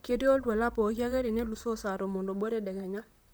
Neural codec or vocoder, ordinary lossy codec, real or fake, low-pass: vocoder, 44.1 kHz, 128 mel bands, Pupu-Vocoder; none; fake; none